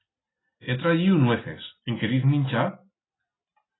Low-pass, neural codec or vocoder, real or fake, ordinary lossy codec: 7.2 kHz; none; real; AAC, 16 kbps